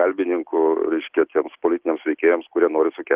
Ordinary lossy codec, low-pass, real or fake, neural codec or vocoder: Opus, 16 kbps; 3.6 kHz; real; none